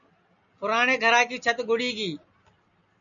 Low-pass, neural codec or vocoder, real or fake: 7.2 kHz; none; real